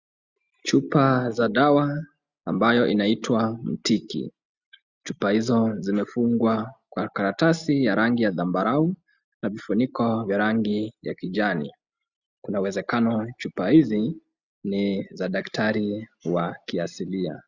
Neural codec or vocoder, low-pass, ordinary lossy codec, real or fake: none; 7.2 kHz; Opus, 64 kbps; real